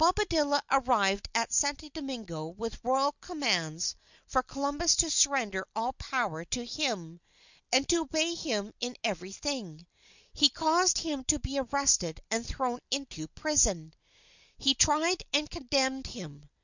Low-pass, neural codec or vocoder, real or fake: 7.2 kHz; none; real